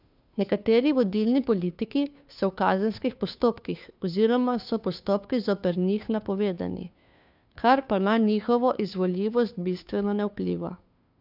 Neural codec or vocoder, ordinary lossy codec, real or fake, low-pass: codec, 16 kHz, 2 kbps, FunCodec, trained on Chinese and English, 25 frames a second; none; fake; 5.4 kHz